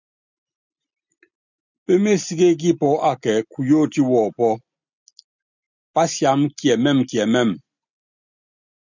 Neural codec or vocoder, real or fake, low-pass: none; real; 7.2 kHz